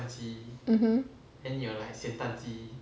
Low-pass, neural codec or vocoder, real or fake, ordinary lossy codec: none; none; real; none